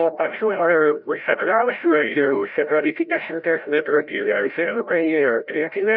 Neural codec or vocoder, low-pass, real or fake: codec, 16 kHz, 0.5 kbps, FreqCodec, larger model; 5.4 kHz; fake